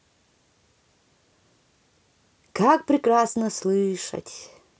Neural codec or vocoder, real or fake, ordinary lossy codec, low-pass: none; real; none; none